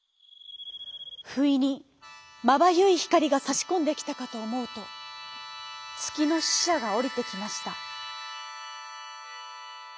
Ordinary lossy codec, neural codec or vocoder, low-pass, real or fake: none; none; none; real